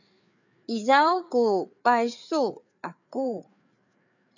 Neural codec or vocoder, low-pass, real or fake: codec, 16 kHz, 4 kbps, FreqCodec, larger model; 7.2 kHz; fake